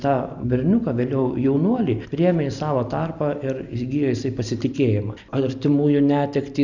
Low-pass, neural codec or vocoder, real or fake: 7.2 kHz; none; real